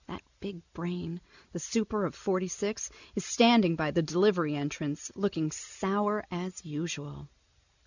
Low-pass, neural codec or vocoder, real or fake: 7.2 kHz; none; real